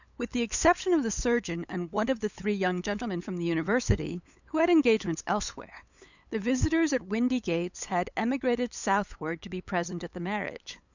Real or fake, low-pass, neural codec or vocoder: fake; 7.2 kHz; codec, 16 kHz, 8 kbps, FunCodec, trained on LibriTTS, 25 frames a second